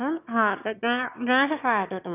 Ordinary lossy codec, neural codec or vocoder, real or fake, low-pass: none; autoencoder, 22.05 kHz, a latent of 192 numbers a frame, VITS, trained on one speaker; fake; 3.6 kHz